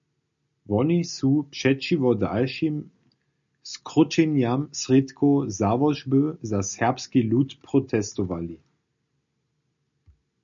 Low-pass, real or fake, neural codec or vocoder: 7.2 kHz; real; none